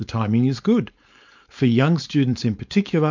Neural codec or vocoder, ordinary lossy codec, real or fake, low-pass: codec, 16 kHz, 4.8 kbps, FACodec; MP3, 64 kbps; fake; 7.2 kHz